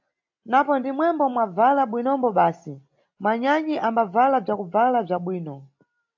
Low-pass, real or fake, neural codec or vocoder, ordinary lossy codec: 7.2 kHz; real; none; AAC, 48 kbps